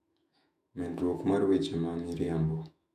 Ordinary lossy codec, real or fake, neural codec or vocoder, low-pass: none; fake; autoencoder, 48 kHz, 128 numbers a frame, DAC-VAE, trained on Japanese speech; 14.4 kHz